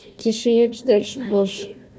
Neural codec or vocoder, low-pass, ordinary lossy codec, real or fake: codec, 16 kHz, 1 kbps, FunCodec, trained on Chinese and English, 50 frames a second; none; none; fake